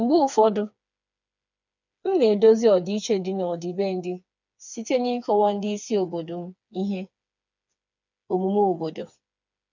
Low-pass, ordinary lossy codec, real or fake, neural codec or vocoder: 7.2 kHz; none; fake; codec, 16 kHz, 4 kbps, FreqCodec, smaller model